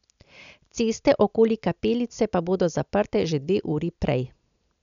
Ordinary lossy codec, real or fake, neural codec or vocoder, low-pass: none; real; none; 7.2 kHz